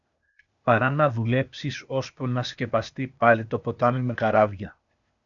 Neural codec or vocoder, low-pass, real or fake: codec, 16 kHz, 0.8 kbps, ZipCodec; 7.2 kHz; fake